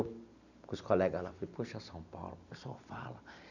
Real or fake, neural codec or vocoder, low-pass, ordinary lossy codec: real; none; 7.2 kHz; none